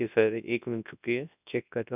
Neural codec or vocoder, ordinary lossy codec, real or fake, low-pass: codec, 24 kHz, 0.9 kbps, WavTokenizer, large speech release; none; fake; 3.6 kHz